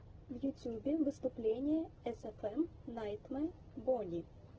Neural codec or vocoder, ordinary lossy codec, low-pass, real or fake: none; Opus, 16 kbps; 7.2 kHz; real